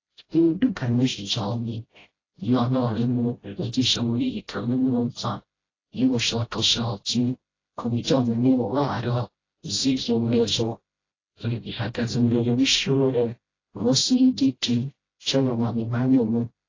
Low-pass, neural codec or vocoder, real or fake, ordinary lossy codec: 7.2 kHz; codec, 16 kHz, 0.5 kbps, FreqCodec, smaller model; fake; AAC, 32 kbps